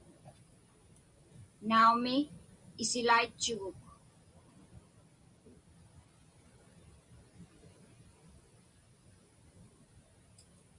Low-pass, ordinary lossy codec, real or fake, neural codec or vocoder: 10.8 kHz; Opus, 64 kbps; real; none